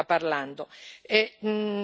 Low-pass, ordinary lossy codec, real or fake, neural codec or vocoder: none; none; real; none